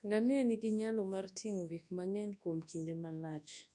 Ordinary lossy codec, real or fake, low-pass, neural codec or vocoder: none; fake; 10.8 kHz; codec, 24 kHz, 0.9 kbps, WavTokenizer, large speech release